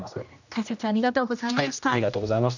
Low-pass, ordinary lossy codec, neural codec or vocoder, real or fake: 7.2 kHz; none; codec, 16 kHz, 1 kbps, X-Codec, HuBERT features, trained on general audio; fake